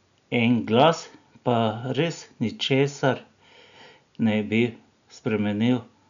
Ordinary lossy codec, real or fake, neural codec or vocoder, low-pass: none; real; none; 7.2 kHz